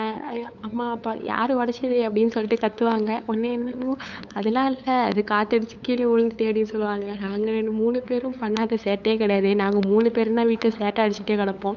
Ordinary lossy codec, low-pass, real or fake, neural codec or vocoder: none; 7.2 kHz; fake; codec, 16 kHz, 8 kbps, FunCodec, trained on LibriTTS, 25 frames a second